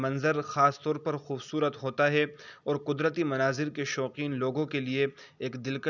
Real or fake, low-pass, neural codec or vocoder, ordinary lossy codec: real; 7.2 kHz; none; none